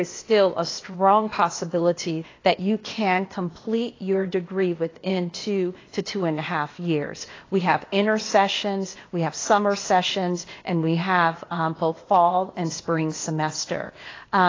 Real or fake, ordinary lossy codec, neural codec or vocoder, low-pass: fake; AAC, 32 kbps; codec, 16 kHz, 0.8 kbps, ZipCodec; 7.2 kHz